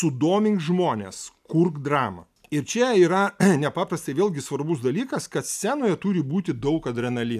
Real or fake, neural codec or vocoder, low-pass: real; none; 14.4 kHz